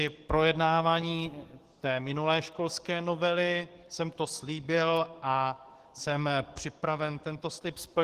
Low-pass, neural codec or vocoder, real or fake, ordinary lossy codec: 14.4 kHz; codec, 44.1 kHz, 7.8 kbps, DAC; fake; Opus, 16 kbps